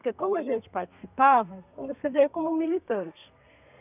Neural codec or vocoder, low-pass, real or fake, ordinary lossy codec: codec, 32 kHz, 1.9 kbps, SNAC; 3.6 kHz; fake; none